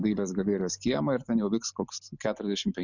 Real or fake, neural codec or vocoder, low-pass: real; none; 7.2 kHz